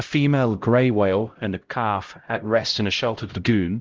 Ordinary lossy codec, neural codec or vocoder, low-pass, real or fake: Opus, 16 kbps; codec, 16 kHz, 0.5 kbps, X-Codec, HuBERT features, trained on LibriSpeech; 7.2 kHz; fake